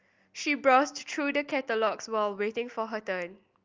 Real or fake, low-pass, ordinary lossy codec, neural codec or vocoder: real; 7.2 kHz; Opus, 32 kbps; none